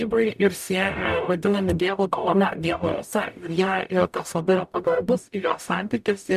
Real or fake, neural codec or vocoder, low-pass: fake; codec, 44.1 kHz, 0.9 kbps, DAC; 14.4 kHz